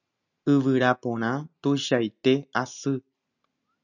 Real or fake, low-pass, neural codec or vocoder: real; 7.2 kHz; none